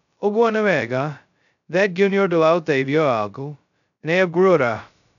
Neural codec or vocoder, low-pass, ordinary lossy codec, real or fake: codec, 16 kHz, 0.2 kbps, FocalCodec; 7.2 kHz; none; fake